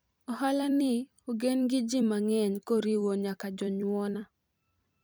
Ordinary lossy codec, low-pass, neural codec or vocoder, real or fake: none; none; vocoder, 44.1 kHz, 128 mel bands every 256 samples, BigVGAN v2; fake